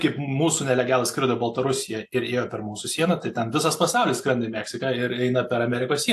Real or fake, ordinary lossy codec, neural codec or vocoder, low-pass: real; AAC, 64 kbps; none; 14.4 kHz